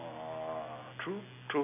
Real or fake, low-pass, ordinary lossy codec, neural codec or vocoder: real; 3.6 kHz; none; none